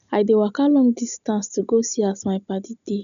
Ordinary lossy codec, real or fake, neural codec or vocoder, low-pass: none; real; none; 7.2 kHz